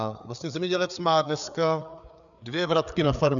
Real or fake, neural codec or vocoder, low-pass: fake; codec, 16 kHz, 4 kbps, FreqCodec, larger model; 7.2 kHz